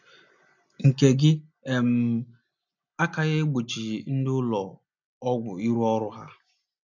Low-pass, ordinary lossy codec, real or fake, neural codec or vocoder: 7.2 kHz; none; real; none